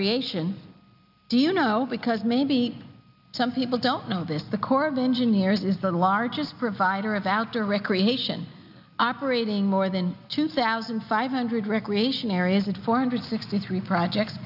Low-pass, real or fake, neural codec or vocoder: 5.4 kHz; real; none